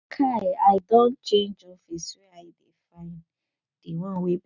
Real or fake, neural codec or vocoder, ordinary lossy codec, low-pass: real; none; none; 7.2 kHz